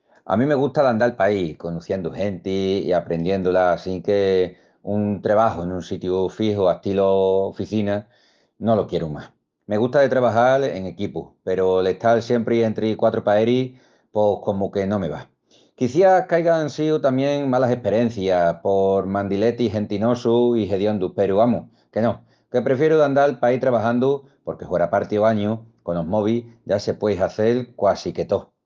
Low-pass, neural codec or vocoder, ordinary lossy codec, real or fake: 7.2 kHz; none; Opus, 32 kbps; real